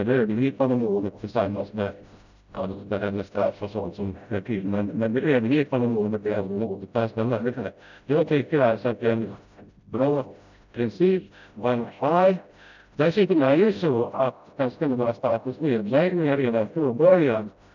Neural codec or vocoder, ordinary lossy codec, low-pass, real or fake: codec, 16 kHz, 0.5 kbps, FreqCodec, smaller model; none; 7.2 kHz; fake